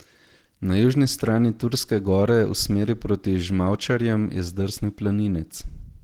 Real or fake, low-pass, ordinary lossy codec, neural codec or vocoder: real; 19.8 kHz; Opus, 16 kbps; none